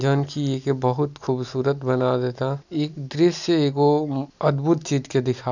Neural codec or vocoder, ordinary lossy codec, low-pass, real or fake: none; none; 7.2 kHz; real